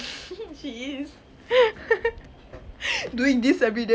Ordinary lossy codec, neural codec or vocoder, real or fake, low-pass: none; none; real; none